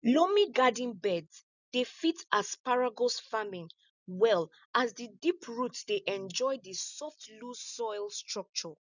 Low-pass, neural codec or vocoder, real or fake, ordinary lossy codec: 7.2 kHz; none; real; none